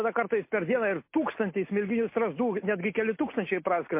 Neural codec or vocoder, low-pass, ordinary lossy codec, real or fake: none; 3.6 kHz; MP3, 24 kbps; real